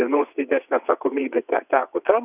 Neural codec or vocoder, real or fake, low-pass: vocoder, 22.05 kHz, 80 mel bands, WaveNeXt; fake; 3.6 kHz